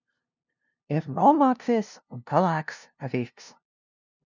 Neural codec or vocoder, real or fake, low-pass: codec, 16 kHz, 0.5 kbps, FunCodec, trained on LibriTTS, 25 frames a second; fake; 7.2 kHz